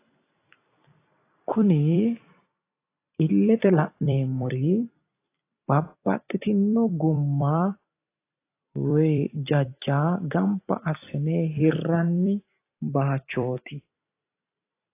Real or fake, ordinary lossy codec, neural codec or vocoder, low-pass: fake; AAC, 24 kbps; vocoder, 44.1 kHz, 128 mel bands every 256 samples, BigVGAN v2; 3.6 kHz